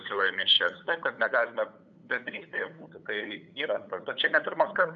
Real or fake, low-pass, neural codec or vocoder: fake; 7.2 kHz; codec, 16 kHz, 8 kbps, FunCodec, trained on LibriTTS, 25 frames a second